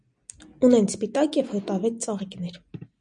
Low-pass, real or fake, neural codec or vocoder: 9.9 kHz; real; none